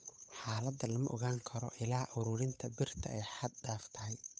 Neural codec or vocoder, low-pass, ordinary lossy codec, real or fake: codec, 16 kHz, 8 kbps, FunCodec, trained on Chinese and English, 25 frames a second; none; none; fake